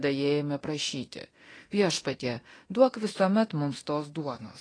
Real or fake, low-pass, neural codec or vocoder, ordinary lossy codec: fake; 9.9 kHz; codec, 24 kHz, 0.9 kbps, DualCodec; AAC, 32 kbps